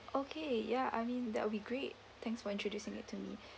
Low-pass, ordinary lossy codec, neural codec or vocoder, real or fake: none; none; none; real